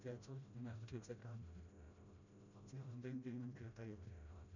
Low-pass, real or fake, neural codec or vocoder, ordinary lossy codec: 7.2 kHz; fake; codec, 16 kHz, 0.5 kbps, FreqCodec, smaller model; none